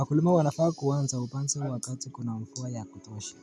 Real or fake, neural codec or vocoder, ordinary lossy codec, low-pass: real; none; none; none